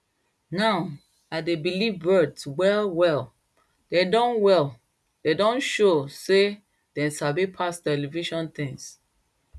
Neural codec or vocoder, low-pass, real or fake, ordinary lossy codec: none; none; real; none